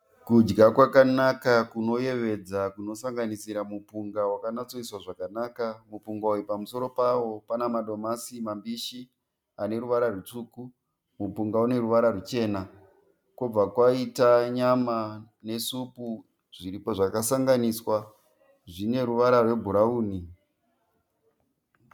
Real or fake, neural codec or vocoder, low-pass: real; none; 19.8 kHz